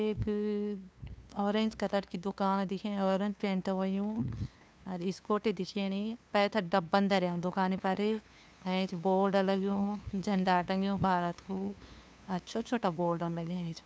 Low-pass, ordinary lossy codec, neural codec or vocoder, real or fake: none; none; codec, 16 kHz, 2 kbps, FunCodec, trained on LibriTTS, 25 frames a second; fake